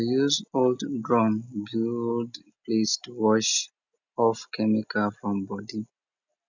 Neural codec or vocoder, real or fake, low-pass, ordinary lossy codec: none; real; 7.2 kHz; none